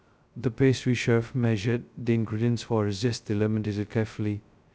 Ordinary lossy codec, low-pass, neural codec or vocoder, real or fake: none; none; codec, 16 kHz, 0.2 kbps, FocalCodec; fake